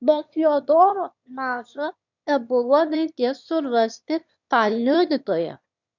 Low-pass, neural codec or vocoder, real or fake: 7.2 kHz; autoencoder, 22.05 kHz, a latent of 192 numbers a frame, VITS, trained on one speaker; fake